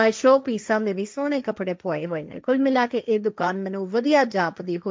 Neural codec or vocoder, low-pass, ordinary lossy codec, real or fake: codec, 16 kHz, 1.1 kbps, Voila-Tokenizer; none; none; fake